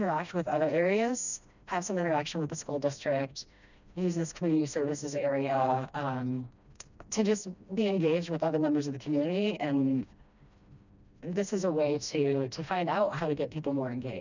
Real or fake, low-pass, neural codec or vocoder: fake; 7.2 kHz; codec, 16 kHz, 1 kbps, FreqCodec, smaller model